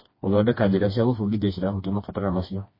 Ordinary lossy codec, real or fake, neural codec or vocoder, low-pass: MP3, 24 kbps; fake; codec, 16 kHz, 2 kbps, FreqCodec, smaller model; 5.4 kHz